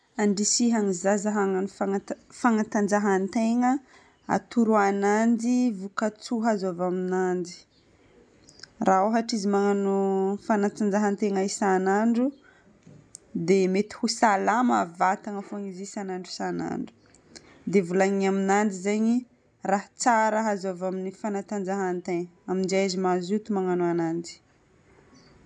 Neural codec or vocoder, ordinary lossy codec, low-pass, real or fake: none; none; 9.9 kHz; real